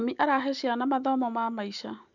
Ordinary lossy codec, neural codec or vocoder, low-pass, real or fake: none; none; 7.2 kHz; real